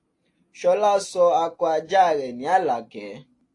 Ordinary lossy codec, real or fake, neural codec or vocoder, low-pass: AAC, 48 kbps; real; none; 10.8 kHz